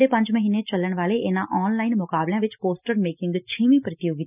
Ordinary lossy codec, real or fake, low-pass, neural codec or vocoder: none; real; 3.6 kHz; none